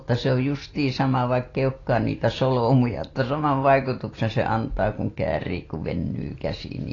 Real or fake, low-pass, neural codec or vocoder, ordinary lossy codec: real; 7.2 kHz; none; AAC, 32 kbps